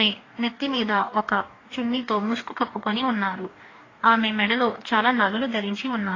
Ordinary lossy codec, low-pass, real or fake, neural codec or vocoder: AAC, 32 kbps; 7.2 kHz; fake; codec, 44.1 kHz, 2.6 kbps, DAC